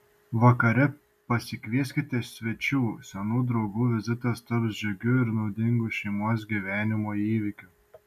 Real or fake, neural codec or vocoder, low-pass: real; none; 14.4 kHz